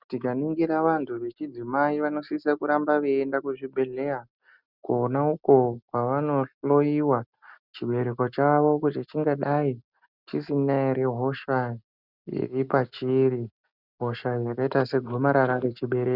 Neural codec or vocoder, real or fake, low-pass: none; real; 5.4 kHz